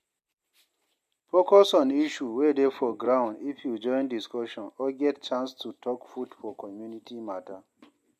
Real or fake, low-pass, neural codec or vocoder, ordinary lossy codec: real; 14.4 kHz; none; MP3, 64 kbps